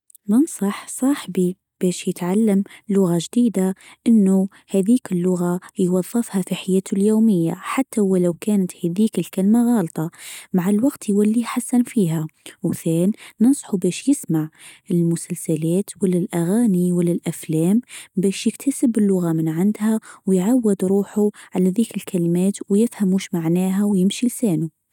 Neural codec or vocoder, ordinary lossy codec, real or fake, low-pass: none; none; real; 19.8 kHz